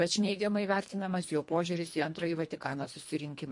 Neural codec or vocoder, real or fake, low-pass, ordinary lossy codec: codec, 24 kHz, 1.5 kbps, HILCodec; fake; 10.8 kHz; MP3, 48 kbps